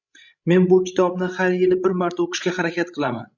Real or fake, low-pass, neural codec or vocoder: fake; 7.2 kHz; codec, 16 kHz, 16 kbps, FreqCodec, larger model